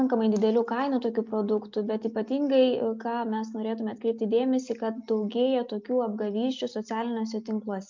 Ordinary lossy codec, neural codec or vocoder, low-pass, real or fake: MP3, 64 kbps; none; 7.2 kHz; real